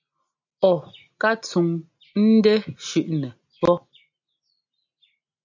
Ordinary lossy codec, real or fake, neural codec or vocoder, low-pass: MP3, 64 kbps; real; none; 7.2 kHz